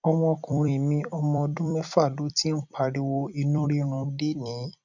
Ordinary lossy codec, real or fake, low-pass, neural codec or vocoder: none; fake; 7.2 kHz; vocoder, 44.1 kHz, 128 mel bands every 256 samples, BigVGAN v2